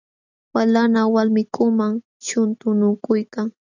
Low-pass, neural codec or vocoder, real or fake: 7.2 kHz; none; real